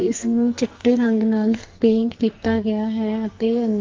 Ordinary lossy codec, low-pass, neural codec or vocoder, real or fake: Opus, 32 kbps; 7.2 kHz; codec, 44.1 kHz, 2.6 kbps, SNAC; fake